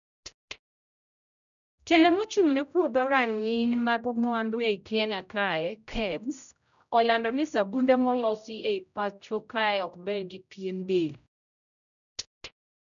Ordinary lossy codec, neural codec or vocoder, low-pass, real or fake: none; codec, 16 kHz, 0.5 kbps, X-Codec, HuBERT features, trained on general audio; 7.2 kHz; fake